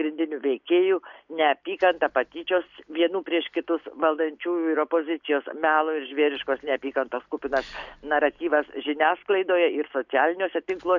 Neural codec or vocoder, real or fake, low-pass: none; real; 7.2 kHz